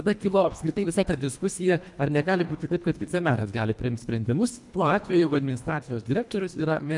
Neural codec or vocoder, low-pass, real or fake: codec, 24 kHz, 1.5 kbps, HILCodec; 10.8 kHz; fake